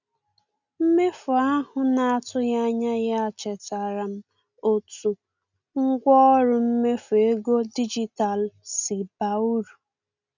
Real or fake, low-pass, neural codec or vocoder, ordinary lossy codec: real; 7.2 kHz; none; none